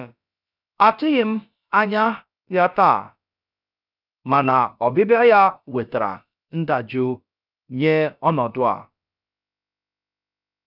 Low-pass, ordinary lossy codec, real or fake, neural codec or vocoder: 5.4 kHz; none; fake; codec, 16 kHz, about 1 kbps, DyCAST, with the encoder's durations